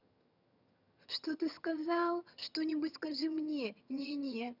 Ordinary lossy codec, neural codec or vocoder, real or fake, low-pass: none; vocoder, 22.05 kHz, 80 mel bands, HiFi-GAN; fake; 5.4 kHz